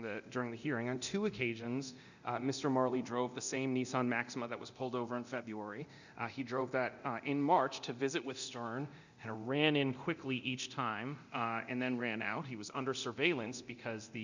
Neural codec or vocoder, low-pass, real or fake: codec, 24 kHz, 0.9 kbps, DualCodec; 7.2 kHz; fake